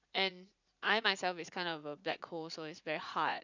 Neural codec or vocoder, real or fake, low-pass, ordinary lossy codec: vocoder, 44.1 kHz, 80 mel bands, Vocos; fake; 7.2 kHz; none